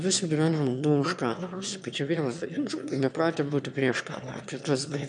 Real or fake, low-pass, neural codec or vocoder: fake; 9.9 kHz; autoencoder, 22.05 kHz, a latent of 192 numbers a frame, VITS, trained on one speaker